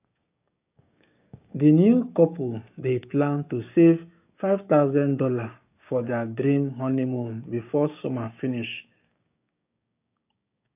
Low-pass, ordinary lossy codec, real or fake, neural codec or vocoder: 3.6 kHz; AAC, 32 kbps; fake; codec, 16 kHz, 6 kbps, DAC